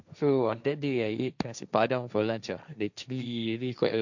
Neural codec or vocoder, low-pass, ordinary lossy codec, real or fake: codec, 16 kHz, 1.1 kbps, Voila-Tokenizer; 7.2 kHz; none; fake